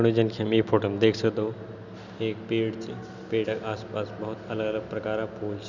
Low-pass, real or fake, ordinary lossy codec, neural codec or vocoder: 7.2 kHz; real; none; none